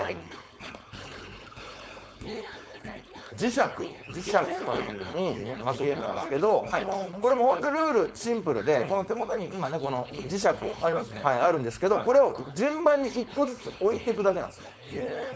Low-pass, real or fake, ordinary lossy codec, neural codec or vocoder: none; fake; none; codec, 16 kHz, 4.8 kbps, FACodec